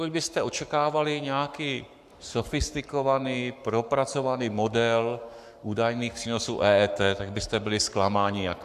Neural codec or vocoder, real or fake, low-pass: codec, 44.1 kHz, 7.8 kbps, Pupu-Codec; fake; 14.4 kHz